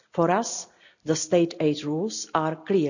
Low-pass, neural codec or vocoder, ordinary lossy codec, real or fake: 7.2 kHz; none; none; real